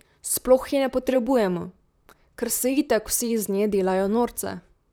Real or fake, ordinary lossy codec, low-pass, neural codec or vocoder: fake; none; none; vocoder, 44.1 kHz, 128 mel bands, Pupu-Vocoder